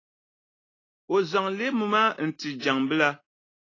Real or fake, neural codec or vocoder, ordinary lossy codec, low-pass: real; none; AAC, 32 kbps; 7.2 kHz